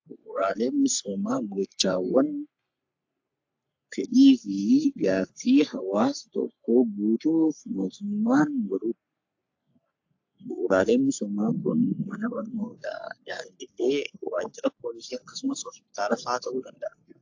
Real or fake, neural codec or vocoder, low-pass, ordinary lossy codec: fake; codec, 44.1 kHz, 3.4 kbps, Pupu-Codec; 7.2 kHz; AAC, 48 kbps